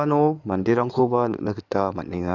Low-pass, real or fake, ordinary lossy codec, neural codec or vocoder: 7.2 kHz; fake; none; codec, 16 kHz in and 24 kHz out, 2.2 kbps, FireRedTTS-2 codec